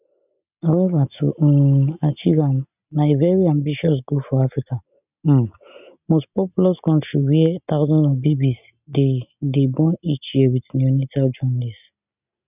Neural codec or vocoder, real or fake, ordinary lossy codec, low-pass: none; real; none; 3.6 kHz